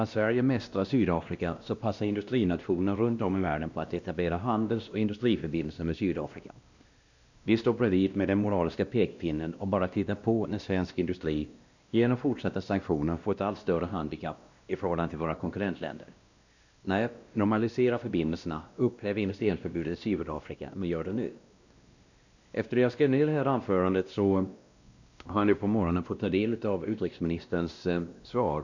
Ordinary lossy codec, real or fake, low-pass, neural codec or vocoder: none; fake; 7.2 kHz; codec, 16 kHz, 1 kbps, X-Codec, WavLM features, trained on Multilingual LibriSpeech